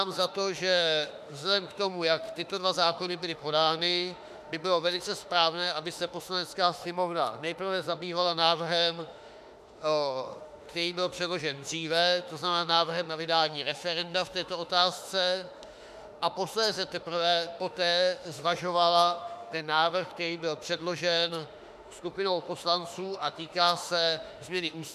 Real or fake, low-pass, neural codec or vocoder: fake; 14.4 kHz; autoencoder, 48 kHz, 32 numbers a frame, DAC-VAE, trained on Japanese speech